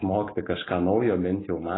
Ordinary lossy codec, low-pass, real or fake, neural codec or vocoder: AAC, 16 kbps; 7.2 kHz; real; none